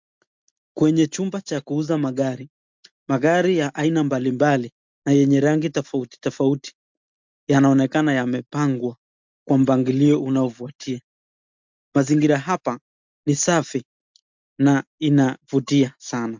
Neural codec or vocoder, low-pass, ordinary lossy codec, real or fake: none; 7.2 kHz; MP3, 64 kbps; real